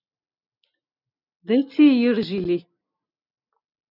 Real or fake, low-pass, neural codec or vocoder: real; 5.4 kHz; none